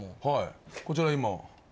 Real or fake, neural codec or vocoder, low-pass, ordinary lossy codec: real; none; none; none